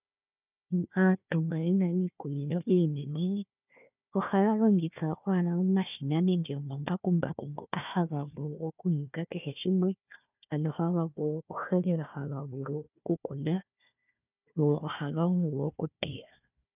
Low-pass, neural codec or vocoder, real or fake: 3.6 kHz; codec, 16 kHz, 1 kbps, FunCodec, trained on Chinese and English, 50 frames a second; fake